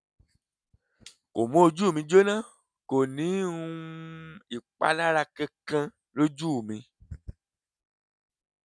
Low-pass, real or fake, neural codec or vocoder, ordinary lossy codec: none; real; none; none